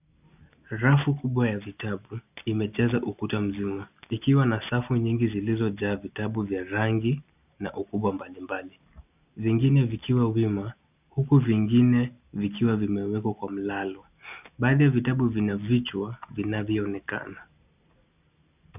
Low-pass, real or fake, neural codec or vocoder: 3.6 kHz; real; none